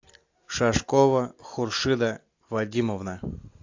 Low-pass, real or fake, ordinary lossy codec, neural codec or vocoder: 7.2 kHz; real; AAC, 48 kbps; none